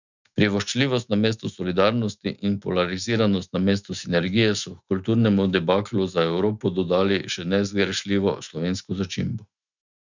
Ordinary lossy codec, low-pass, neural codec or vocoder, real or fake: none; 7.2 kHz; autoencoder, 48 kHz, 128 numbers a frame, DAC-VAE, trained on Japanese speech; fake